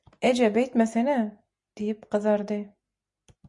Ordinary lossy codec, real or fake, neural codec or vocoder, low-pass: MP3, 96 kbps; real; none; 10.8 kHz